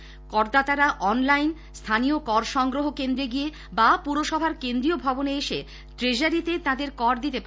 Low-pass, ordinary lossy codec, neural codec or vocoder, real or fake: none; none; none; real